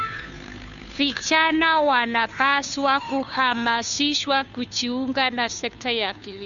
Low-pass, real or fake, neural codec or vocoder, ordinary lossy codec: 7.2 kHz; fake; codec, 16 kHz, 2 kbps, FunCodec, trained on Chinese and English, 25 frames a second; none